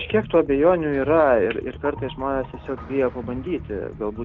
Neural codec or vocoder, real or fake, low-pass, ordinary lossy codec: none; real; 7.2 kHz; Opus, 16 kbps